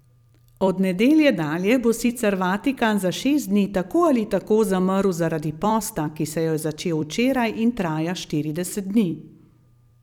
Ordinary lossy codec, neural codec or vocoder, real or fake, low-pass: none; vocoder, 44.1 kHz, 128 mel bands every 256 samples, BigVGAN v2; fake; 19.8 kHz